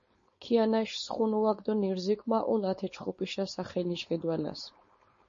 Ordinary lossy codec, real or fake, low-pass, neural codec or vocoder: MP3, 32 kbps; fake; 7.2 kHz; codec, 16 kHz, 4.8 kbps, FACodec